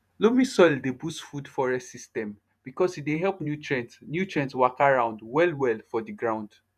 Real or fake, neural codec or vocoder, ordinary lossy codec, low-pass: fake; vocoder, 48 kHz, 128 mel bands, Vocos; none; 14.4 kHz